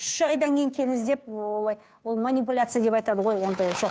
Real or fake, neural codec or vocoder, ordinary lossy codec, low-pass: fake; codec, 16 kHz, 2 kbps, FunCodec, trained on Chinese and English, 25 frames a second; none; none